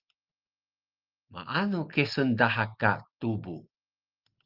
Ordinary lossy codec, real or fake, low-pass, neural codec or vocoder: Opus, 24 kbps; real; 5.4 kHz; none